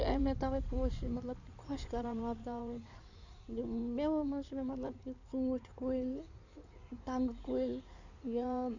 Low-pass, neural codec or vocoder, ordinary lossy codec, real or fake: 7.2 kHz; codec, 16 kHz in and 24 kHz out, 2.2 kbps, FireRedTTS-2 codec; none; fake